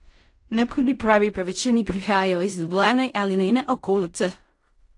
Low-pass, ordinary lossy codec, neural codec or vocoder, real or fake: 10.8 kHz; AAC, 48 kbps; codec, 16 kHz in and 24 kHz out, 0.4 kbps, LongCat-Audio-Codec, fine tuned four codebook decoder; fake